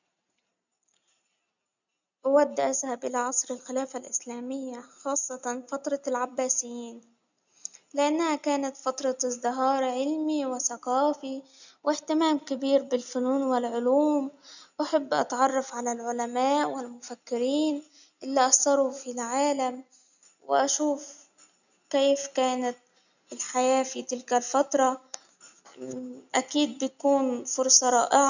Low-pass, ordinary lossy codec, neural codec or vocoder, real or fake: 7.2 kHz; none; none; real